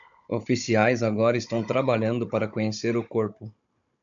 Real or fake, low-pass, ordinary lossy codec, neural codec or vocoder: fake; 7.2 kHz; MP3, 96 kbps; codec, 16 kHz, 16 kbps, FunCodec, trained on Chinese and English, 50 frames a second